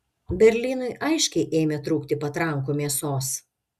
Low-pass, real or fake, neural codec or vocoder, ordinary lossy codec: 14.4 kHz; real; none; Opus, 64 kbps